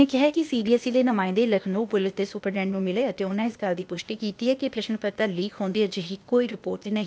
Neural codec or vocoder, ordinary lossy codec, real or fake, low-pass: codec, 16 kHz, 0.8 kbps, ZipCodec; none; fake; none